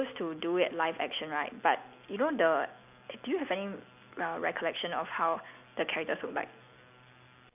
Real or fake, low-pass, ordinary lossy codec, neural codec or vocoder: real; 3.6 kHz; none; none